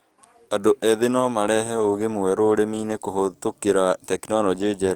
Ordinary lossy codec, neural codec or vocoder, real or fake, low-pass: Opus, 24 kbps; codec, 44.1 kHz, 7.8 kbps, DAC; fake; 19.8 kHz